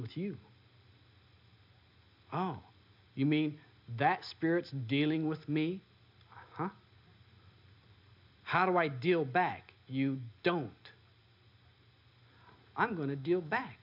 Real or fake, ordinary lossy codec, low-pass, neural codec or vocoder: real; MP3, 48 kbps; 5.4 kHz; none